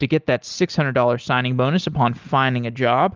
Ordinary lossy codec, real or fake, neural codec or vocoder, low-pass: Opus, 24 kbps; real; none; 7.2 kHz